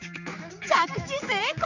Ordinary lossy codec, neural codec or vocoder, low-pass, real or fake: none; codec, 16 kHz, 4 kbps, X-Codec, HuBERT features, trained on general audio; 7.2 kHz; fake